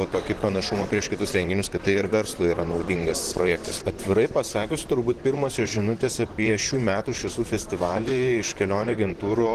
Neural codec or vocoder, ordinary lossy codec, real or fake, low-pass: vocoder, 44.1 kHz, 128 mel bands, Pupu-Vocoder; Opus, 16 kbps; fake; 14.4 kHz